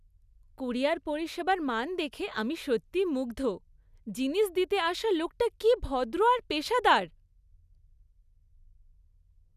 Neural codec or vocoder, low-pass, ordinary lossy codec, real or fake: none; 14.4 kHz; none; real